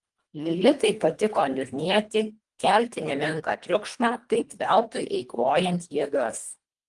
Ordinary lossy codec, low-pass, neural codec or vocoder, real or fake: Opus, 32 kbps; 10.8 kHz; codec, 24 kHz, 1.5 kbps, HILCodec; fake